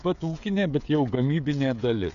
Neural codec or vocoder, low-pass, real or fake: codec, 16 kHz, 16 kbps, FreqCodec, smaller model; 7.2 kHz; fake